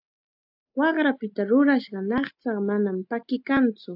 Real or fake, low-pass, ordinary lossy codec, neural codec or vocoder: real; 5.4 kHz; AAC, 48 kbps; none